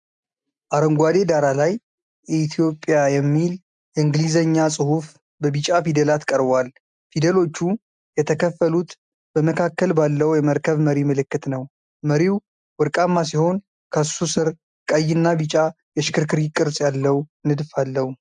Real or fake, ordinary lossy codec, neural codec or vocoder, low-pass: real; MP3, 96 kbps; none; 9.9 kHz